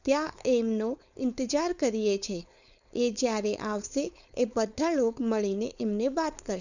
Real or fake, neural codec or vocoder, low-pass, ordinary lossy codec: fake; codec, 16 kHz, 4.8 kbps, FACodec; 7.2 kHz; none